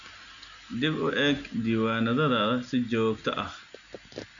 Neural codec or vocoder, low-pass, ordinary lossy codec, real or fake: none; 7.2 kHz; none; real